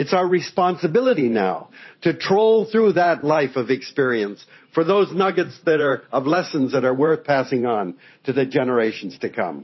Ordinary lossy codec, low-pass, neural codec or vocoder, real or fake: MP3, 24 kbps; 7.2 kHz; vocoder, 44.1 kHz, 128 mel bands every 512 samples, BigVGAN v2; fake